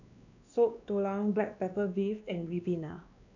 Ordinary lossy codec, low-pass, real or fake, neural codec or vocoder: none; 7.2 kHz; fake; codec, 16 kHz, 2 kbps, X-Codec, WavLM features, trained on Multilingual LibriSpeech